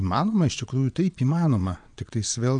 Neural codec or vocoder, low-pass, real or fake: vocoder, 22.05 kHz, 80 mel bands, Vocos; 9.9 kHz; fake